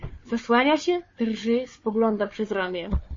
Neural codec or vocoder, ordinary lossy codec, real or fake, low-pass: codec, 16 kHz, 4 kbps, FunCodec, trained on Chinese and English, 50 frames a second; MP3, 32 kbps; fake; 7.2 kHz